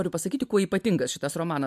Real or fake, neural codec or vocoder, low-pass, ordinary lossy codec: real; none; 14.4 kHz; MP3, 96 kbps